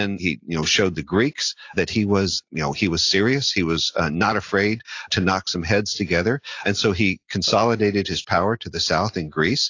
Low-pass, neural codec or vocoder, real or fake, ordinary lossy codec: 7.2 kHz; none; real; AAC, 48 kbps